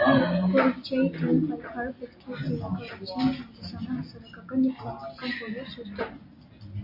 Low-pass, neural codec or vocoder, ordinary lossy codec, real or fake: 5.4 kHz; none; MP3, 32 kbps; real